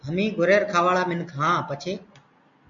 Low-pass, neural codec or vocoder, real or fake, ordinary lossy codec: 7.2 kHz; none; real; MP3, 48 kbps